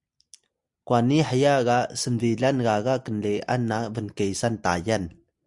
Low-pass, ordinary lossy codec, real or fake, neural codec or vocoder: 10.8 kHz; Opus, 64 kbps; real; none